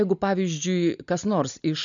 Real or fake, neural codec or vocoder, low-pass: real; none; 7.2 kHz